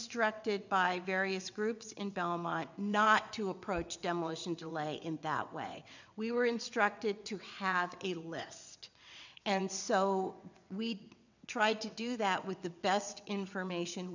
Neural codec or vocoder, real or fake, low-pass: vocoder, 22.05 kHz, 80 mel bands, WaveNeXt; fake; 7.2 kHz